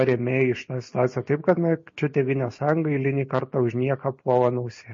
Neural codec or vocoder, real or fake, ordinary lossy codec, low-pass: none; real; MP3, 32 kbps; 7.2 kHz